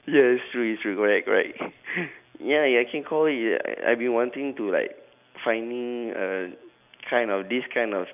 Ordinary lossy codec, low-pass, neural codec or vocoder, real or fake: none; 3.6 kHz; none; real